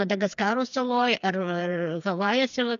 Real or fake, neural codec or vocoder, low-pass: fake; codec, 16 kHz, 4 kbps, FreqCodec, smaller model; 7.2 kHz